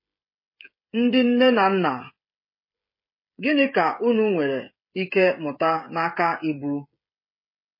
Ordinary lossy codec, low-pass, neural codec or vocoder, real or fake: MP3, 24 kbps; 5.4 kHz; codec, 16 kHz, 16 kbps, FreqCodec, smaller model; fake